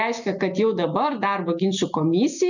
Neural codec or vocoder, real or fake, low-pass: none; real; 7.2 kHz